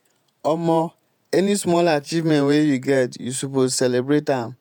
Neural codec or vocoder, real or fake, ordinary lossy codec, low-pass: vocoder, 48 kHz, 128 mel bands, Vocos; fake; none; 19.8 kHz